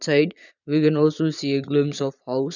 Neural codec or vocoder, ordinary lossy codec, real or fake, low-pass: vocoder, 22.05 kHz, 80 mel bands, Vocos; none; fake; 7.2 kHz